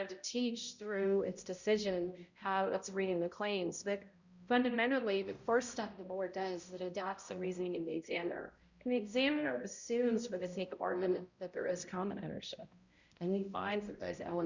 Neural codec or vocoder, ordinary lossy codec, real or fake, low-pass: codec, 16 kHz, 0.5 kbps, X-Codec, HuBERT features, trained on balanced general audio; Opus, 64 kbps; fake; 7.2 kHz